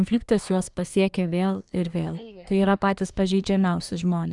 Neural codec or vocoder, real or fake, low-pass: codec, 24 kHz, 1 kbps, SNAC; fake; 10.8 kHz